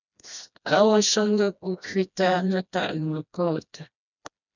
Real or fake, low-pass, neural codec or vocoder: fake; 7.2 kHz; codec, 16 kHz, 1 kbps, FreqCodec, smaller model